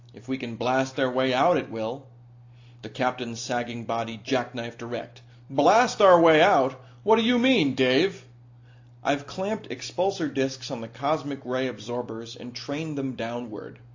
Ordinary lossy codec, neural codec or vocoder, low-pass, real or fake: AAC, 48 kbps; none; 7.2 kHz; real